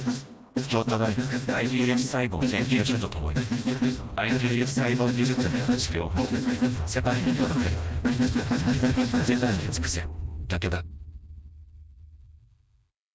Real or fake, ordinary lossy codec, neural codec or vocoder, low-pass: fake; none; codec, 16 kHz, 1 kbps, FreqCodec, smaller model; none